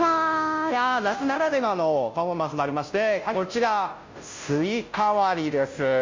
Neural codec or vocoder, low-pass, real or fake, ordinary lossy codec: codec, 16 kHz, 0.5 kbps, FunCodec, trained on Chinese and English, 25 frames a second; 7.2 kHz; fake; MP3, 48 kbps